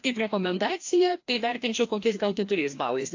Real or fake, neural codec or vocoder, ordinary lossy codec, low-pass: fake; codec, 16 kHz, 1 kbps, FreqCodec, larger model; AAC, 48 kbps; 7.2 kHz